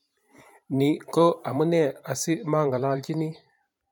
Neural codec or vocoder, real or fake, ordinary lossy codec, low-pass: vocoder, 44.1 kHz, 128 mel bands every 512 samples, BigVGAN v2; fake; none; 19.8 kHz